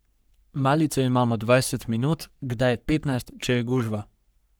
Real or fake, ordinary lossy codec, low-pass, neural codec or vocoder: fake; none; none; codec, 44.1 kHz, 3.4 kbps, Pupu-Codec